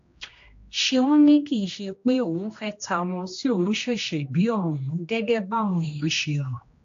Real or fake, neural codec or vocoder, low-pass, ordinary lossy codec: fake; codec, 16 kHz, 1 kbps, X-Codec, HuBERT features, trained on general audio; 7.2 kHz; AAC, 48 kbps